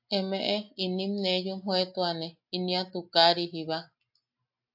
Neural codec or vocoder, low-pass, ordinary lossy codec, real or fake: none; 5.4 kHz; AAC, 48 kbps; real